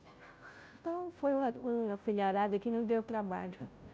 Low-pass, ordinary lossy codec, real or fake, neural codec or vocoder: none; none; fake; codec, 16 kHz, 0.5 kbps, FunCodec, trained on Chinese and English, 25 frames a second